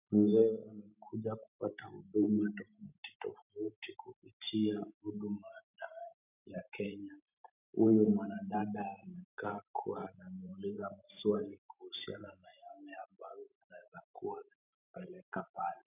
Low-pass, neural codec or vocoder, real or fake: 3.6 kHz; vocoder, 44.1 kHz, 128 mel bands every 512 samples, BigVGAN v2; fake